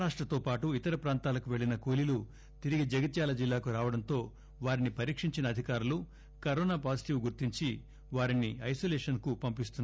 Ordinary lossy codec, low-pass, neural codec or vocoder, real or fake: none; none; none; real